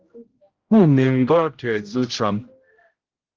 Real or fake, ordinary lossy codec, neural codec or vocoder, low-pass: fake; Opus, 16 kbps; codec, 16 kHz, 0.5 kbps, X-Codec, HuBERT features, trained on general audio; 7.2 kHz